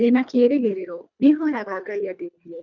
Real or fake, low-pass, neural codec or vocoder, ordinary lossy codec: fake; 7.2 kHz; codec, 24 kHz, 1.5 kbps, HILCodec; none